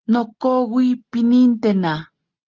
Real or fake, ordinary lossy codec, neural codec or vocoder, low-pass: real; Opus, 16 kbps; none; 7.2 kHz